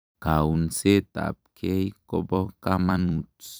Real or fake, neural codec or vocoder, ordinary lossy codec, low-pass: fake; vocoder, 44.1 kHz, 128 mel bands every 256 samples, BigVGAN v2; none; none